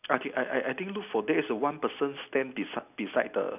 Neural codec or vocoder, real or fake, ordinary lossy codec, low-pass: none; real; none; 3.6 kHz